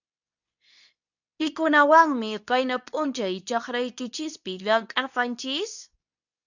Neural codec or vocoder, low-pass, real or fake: codec, 24 kHz, 0.9 kbps, WavTokenizer, medium speech release version 2; 7.2 kHz; fake